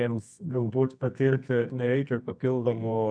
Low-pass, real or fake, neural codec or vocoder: 9.9 kHz; fake; codec, 24 kHz, 0.9 kbps, WavTokenizer, medium music audio release